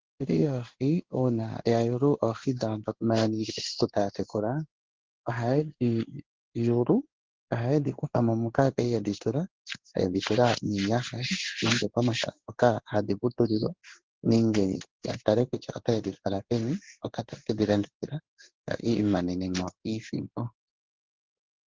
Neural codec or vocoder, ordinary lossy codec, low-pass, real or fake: codec, 16 kHz in and 24 kHz out, 1 kbps, XY-Tokenizer; Opus, 16 kbps; 7.2 kHz; fake